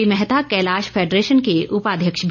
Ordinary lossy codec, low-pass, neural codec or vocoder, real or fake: none; 7.2 kHz; none; real